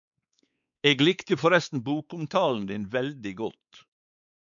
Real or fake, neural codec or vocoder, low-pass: fake; codec, 16 kHz, 4 kbps, X-Codec, WavLM features, trained on Multilingual LibriSpeech; 7.2 kHz